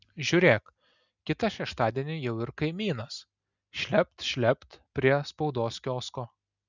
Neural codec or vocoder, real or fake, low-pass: none; real; 7.2 kHz